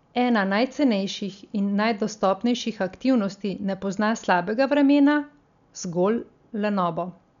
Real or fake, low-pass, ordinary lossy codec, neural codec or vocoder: real; 7.2 kHz; none; none